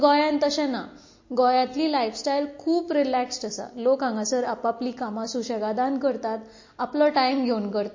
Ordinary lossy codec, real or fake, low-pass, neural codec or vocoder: MP3, 32 kbps; real; 7.2 kHz; none